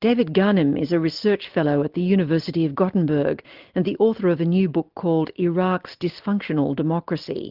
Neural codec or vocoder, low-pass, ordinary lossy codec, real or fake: none; 5.4 kHz; Opus, 32 kbps; real